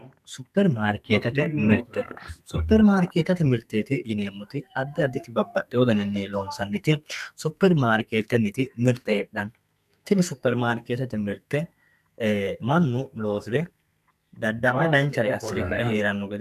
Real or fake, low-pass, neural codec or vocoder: fake; 14.4 kHz; codec, 32 kHz, 1.9 kbps, SNAC